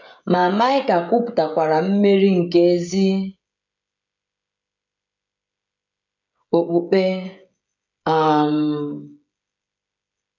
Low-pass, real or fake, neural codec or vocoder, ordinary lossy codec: 7.2 kHz; fake; codec, 16 kHz, 16 kbps, FreqCodec, smaller model; none